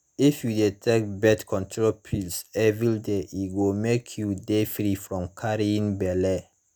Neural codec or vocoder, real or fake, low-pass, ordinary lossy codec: none; real; none; none